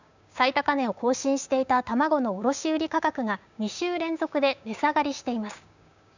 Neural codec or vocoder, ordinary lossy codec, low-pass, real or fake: codec, 16 kHz, 6 kbps, DAC; none; 7.2 kHz; fake